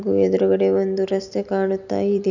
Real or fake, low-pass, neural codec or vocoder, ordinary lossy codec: real; 7.2 kHz; none; none